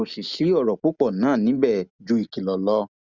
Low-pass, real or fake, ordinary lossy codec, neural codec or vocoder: 7.2 kHz; real; Opus, 64 kbps; none